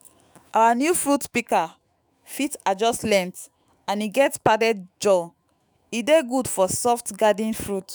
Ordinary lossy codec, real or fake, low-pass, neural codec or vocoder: none; fake; none; autoencoder, 48 kHz, 128 numbers a frame, DAC-VAE, trained on Japanese speech